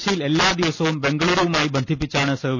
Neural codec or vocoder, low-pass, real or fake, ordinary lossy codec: none; 7.2 kHz; real; none